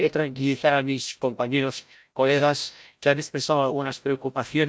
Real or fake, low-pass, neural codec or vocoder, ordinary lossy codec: fake; none; codec, 16 kHz, 0.5 kbps, FreqCodec, larger model; none